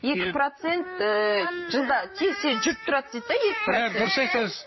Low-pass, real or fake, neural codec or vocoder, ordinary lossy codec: 7.2 kHz; fake; vocoder, 44.1 kHz, 128 mel bands, Pupu-Vocoder; MP3, 24 kbps